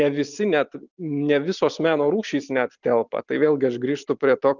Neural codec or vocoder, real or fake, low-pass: vocoder, 44.1 kHz, 128 mel bands every 256 samples, BigVGAN v2; fake; 7.2 kHz